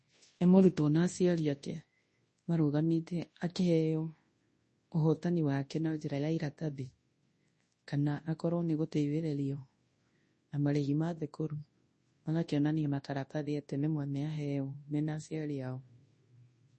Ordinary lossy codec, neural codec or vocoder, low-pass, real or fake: MP3, 32 kbps; codec, 24 kHz, 0.9 kbps, WavTokenizer, large speech release; 10.8 kHz; fake